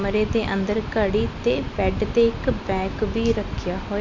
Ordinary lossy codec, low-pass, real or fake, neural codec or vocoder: MP3, 48 kbps; 7.2 kHz; real; none